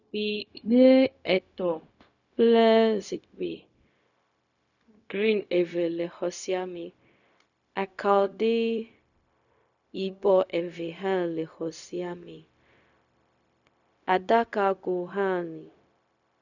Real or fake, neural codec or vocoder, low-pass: fake; codec, 16 kHz, 0.4 kbps, LongCat-Audio-Codec; 7.2 kHz